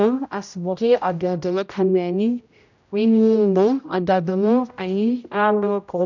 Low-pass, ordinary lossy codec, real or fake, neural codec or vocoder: 7.2 kHz; none; fake; codec, 16 kHz, 0.5 kbps, X-Codec, HuBERT features, trained on general audio